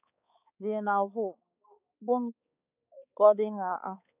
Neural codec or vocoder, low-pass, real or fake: codec, 16 kHz, 4 kbps, X-Codec, HuBERT features, trained on balanced general audio; 3.6 kHz; fake